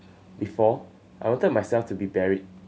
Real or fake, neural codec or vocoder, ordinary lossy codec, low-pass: real; none; none; none